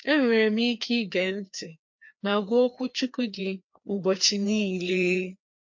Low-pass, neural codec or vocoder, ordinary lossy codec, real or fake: 7.2 kHz; codec, 16 kHz, 2 kbps, FreqCodec, larger model; MP3, 48 kbps; fake